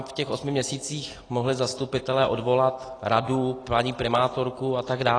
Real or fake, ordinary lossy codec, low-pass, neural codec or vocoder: real; AAC, 32 kbps; 9.9 kHz; none